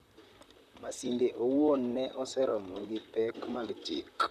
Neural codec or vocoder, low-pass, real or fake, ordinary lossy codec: vocoder, 44.1 kHz, 128 mel bands, Pupu-Vocoder; 14.4 kHz; fake; none